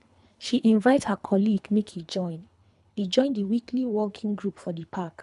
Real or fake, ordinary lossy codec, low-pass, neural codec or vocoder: fake; none; 10.8 kHz; codec, 24 kHz, 3 kbps, HILCodec